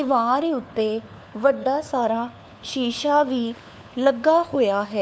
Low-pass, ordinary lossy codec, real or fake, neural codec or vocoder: none; none; fake; codec, 16 kHz, 4 kbps, FunCodec, trained on LibriTTS, 50 frames a second